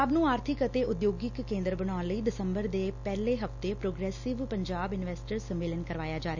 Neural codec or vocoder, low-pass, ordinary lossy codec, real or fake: none; 7.2 kHz; none; real